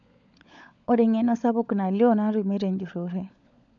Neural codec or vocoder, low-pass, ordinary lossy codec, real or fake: codec, 16 kHz, 16 kbps, FunCodec, trained on LibriTTS, 50 frames a second; 7.2 kHz; none; fake